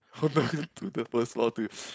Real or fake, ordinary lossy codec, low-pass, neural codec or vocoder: fake; none; none; codec, 16 kHz, 4.8 kbps, FACodec